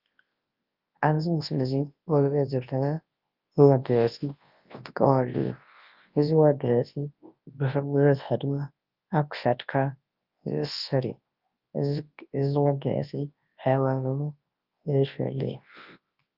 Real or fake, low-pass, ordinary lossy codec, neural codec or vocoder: fake; 5.4 kHz; Opus, 24 kbps; codec, 24 kHz, 0.9 kbps, WavTokenizer, large speech release